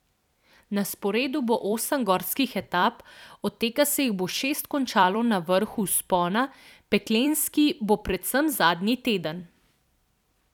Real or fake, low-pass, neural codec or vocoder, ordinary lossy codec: fake; 19.8 kHz; vocoder, 44.1 kHz, 128 mel bands every 256 samples, BigVGAN v2; none